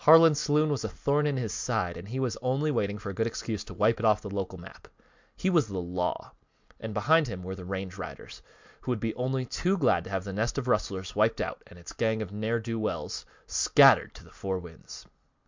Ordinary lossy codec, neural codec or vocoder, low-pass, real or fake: MP3, 64 kbps; none; 7.2 kHz; real